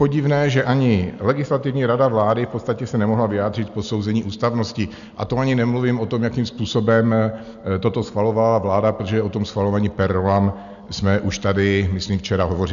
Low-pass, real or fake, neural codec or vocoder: 7.2 kHz; real; none